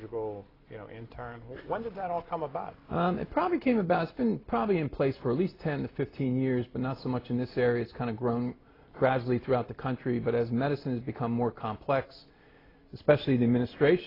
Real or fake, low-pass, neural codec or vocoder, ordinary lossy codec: real; 5.4 kHz; none; AAC, 24 kbps